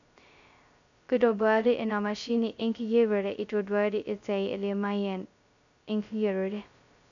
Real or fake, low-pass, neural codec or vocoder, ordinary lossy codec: fake; 7.2 kHz; codec, 16 kHz, 0.2 kbps, FocalCodec; none